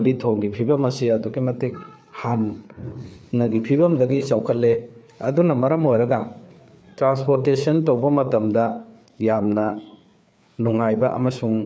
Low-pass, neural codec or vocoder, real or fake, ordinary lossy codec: none; codec, 16 kHz, 4 kbps, FreqCodec, larger model; fake; none